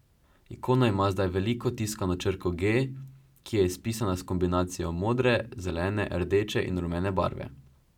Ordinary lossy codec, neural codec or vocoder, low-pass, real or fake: none; none; 19.8 kHz; real